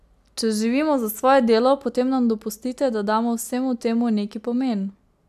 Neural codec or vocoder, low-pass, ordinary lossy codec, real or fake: none; 14.4 kHz; AAC, 96 kbps; real